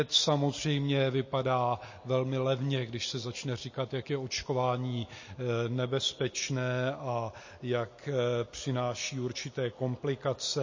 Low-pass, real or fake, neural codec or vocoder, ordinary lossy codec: 7.2 kHz; real; none; MP3, 32 kbps